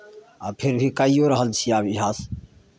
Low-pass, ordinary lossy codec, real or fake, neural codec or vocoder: none; none; real; none